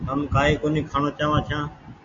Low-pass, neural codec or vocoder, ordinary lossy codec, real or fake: 7.2 kHz; none; AAC, 48 kbps; real